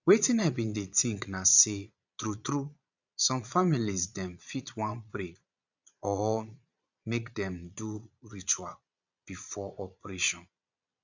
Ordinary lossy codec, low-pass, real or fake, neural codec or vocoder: none; 7.2 kHz; fake; vocoder, 22.05 kHz, 80 mel bands, Vocos